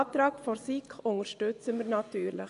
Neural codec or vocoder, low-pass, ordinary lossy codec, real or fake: vocoder, 24 kHz, 100 mel bands, Vocos; 10.8 kHz; none; fake